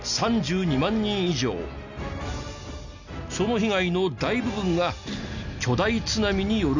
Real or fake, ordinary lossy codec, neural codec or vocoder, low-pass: real; none; none; 7.2 kHz